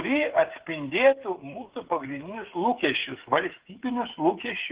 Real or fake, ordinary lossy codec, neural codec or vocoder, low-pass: fake; Opus, 16 kbps; codec, 16 kHz, 8 kbps, FreqCodec, smaller model; 3.6 kHz